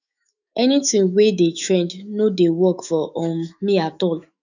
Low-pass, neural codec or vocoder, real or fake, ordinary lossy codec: 7.2 kHz; autoencoder, 48 kHz, 128 numbers a frame, DAC-VAE, trained on Japanese speech; fake; none